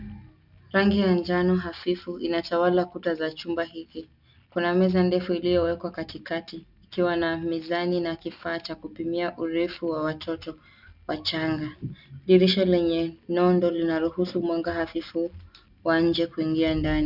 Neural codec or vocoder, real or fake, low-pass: none; real; 5.4 kHz